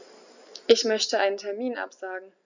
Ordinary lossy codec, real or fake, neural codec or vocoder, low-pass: none; real; none; 7.2 kHz